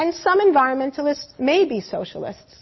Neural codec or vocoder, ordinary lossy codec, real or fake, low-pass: none; MP3, 24 kbps; real; 7.2 kHz